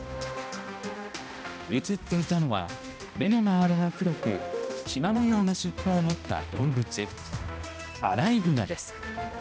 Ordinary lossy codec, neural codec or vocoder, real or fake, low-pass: none; codec, 16 kHz, 1 kbps, X-Codec, HuBERT features, trained on balanced general audio; fake; none